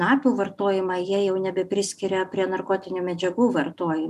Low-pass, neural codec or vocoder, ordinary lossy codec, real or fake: 14.4 kHz; none; MP3, 96 kbps; real